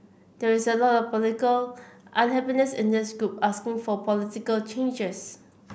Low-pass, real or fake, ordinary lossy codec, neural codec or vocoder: none; real; none; none